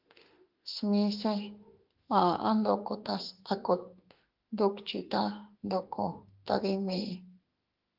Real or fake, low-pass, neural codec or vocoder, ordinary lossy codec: fake; 5.4 kHz; autoencoder, 48 kHz, 32 numbers a frame, DAC-VAE, trained on Japanese speech; Opus, 32 kbps